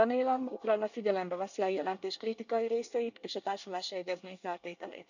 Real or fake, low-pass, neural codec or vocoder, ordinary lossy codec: fake; 7.2 kHz; codec, 24 kHz, 1 kbps, SNAC; none